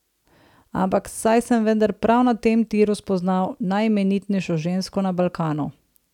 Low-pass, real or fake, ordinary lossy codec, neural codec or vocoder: 19.8 kHz; real; none; none